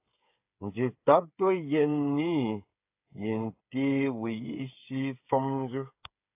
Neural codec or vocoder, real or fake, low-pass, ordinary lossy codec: codec, 16 kHz, 8 kbps, FreqCodec, smaller model; fake; 3.6 kHz; AAC, 32 kbps